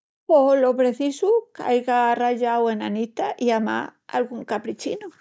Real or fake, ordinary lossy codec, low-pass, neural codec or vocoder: real; none; none; none